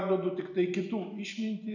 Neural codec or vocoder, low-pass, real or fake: none; 7.2 kHz; real